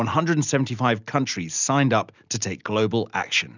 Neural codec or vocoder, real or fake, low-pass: none; real; 7.2 kHz